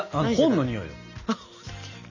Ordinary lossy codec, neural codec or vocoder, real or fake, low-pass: none; none; real; 7.2 kHz